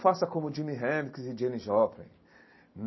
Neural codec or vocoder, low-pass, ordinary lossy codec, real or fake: none; 7.2 kHz; MP3, 24 kbps; real